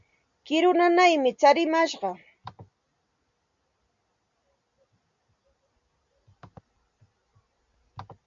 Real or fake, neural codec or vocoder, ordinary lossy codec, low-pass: real; none; MP3, 64 kbps; 7.2 kHz